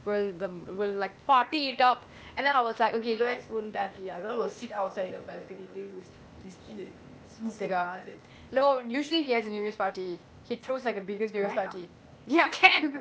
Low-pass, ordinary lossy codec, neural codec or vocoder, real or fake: none; none; codec, 16 kHz, 0.8 kbps, ZipCodec; fake